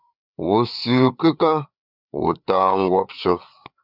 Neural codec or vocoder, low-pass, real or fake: codec, 16 kHz, 4 kbps, FreqCodec, larger model; 5.4 kHz; fake